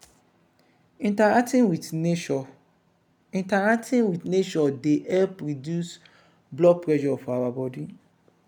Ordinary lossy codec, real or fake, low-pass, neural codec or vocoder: none; real; 19.8 kHz; none